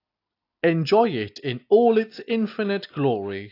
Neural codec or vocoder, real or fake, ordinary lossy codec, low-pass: none; real; AAC, 32 kbps; 5.4 kHz